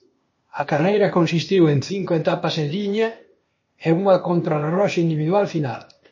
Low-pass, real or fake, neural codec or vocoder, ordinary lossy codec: 7.2 kHz; fake; codec, 16 kHz, 0.8 kbps, ZipCodec; MP3, 32 kbps